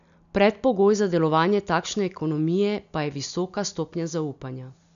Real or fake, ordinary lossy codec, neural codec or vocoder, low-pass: real; none; none; 7.2 kHz